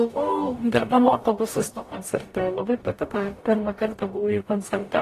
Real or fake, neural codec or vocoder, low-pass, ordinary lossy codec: fake; codec, 44.1 kHz, 0.9 kbps, DAC; 14.4 kHz; AAC, 48 kbps